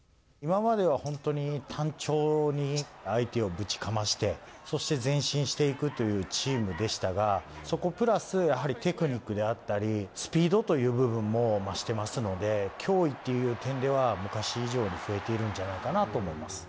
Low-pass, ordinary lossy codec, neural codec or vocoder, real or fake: none; none; none; real